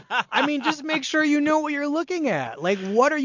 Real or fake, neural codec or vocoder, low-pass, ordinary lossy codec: real; none; 7.2 kHz; MP3, 48 kbps